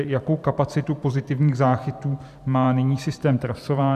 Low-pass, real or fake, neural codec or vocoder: 14.4 kHz; fake; vocoder, 44.1 kHz, 128 mel bands every 512 samples, BigVGAN v2